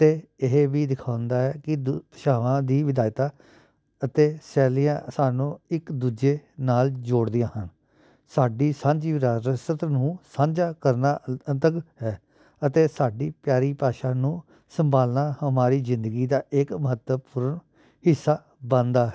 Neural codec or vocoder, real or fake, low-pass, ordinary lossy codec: none; real; none; none